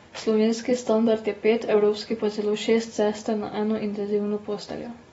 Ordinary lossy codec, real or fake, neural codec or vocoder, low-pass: AAC, 24 kbps; real; none; 19.8 kHz